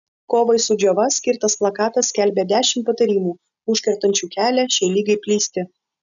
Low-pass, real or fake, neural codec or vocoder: 7.2 kHz; real; none